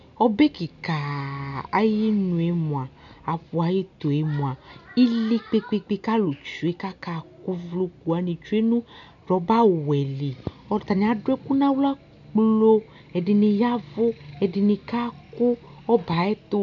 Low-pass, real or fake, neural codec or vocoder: 7.2 kHz; real; none